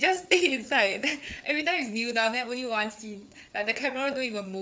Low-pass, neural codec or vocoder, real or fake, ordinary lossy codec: none; codec, 16 kHz, 4 kbps, FreqCodec, larger model; fake; none